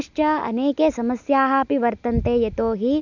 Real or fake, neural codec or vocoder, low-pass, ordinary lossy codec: real; none; 7.2 kHz; none